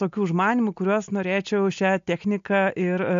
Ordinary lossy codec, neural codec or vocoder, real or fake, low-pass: AAC, 64 kbps; none; real; 7.2 kHz